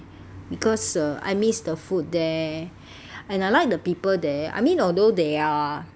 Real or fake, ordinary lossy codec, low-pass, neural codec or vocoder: real; none; none; none